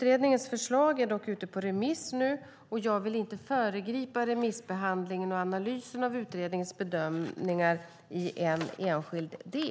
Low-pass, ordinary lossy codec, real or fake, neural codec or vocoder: none; none; real; none